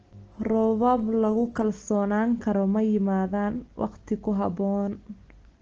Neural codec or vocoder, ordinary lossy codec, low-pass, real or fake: none; Opus, 16 kbps; 7.2 kHz; real